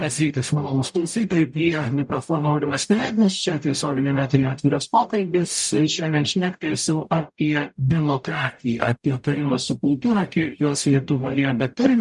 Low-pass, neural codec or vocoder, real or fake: 10.8 kHz; codec, 44.1 kHz, 0.9 kbps, DAC; fake